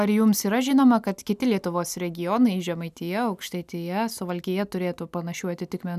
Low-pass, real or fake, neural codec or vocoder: 14.4 kHz; real; none